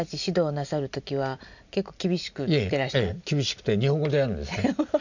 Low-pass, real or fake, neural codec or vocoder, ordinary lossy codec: 7.2 kHz; real; none; none